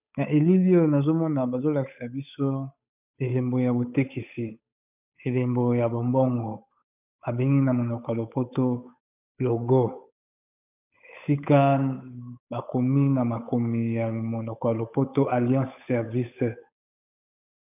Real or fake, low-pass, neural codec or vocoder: fake; 3.6 kHz; codec, 16 kHz, 8 kbps, FunCodec, trained on Chinese and English, 25 frames a second